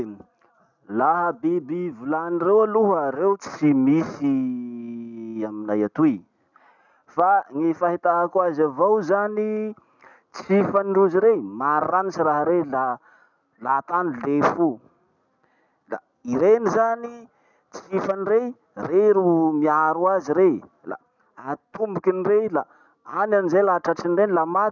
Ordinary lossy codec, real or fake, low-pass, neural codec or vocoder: none; real; 7.2 kHz; none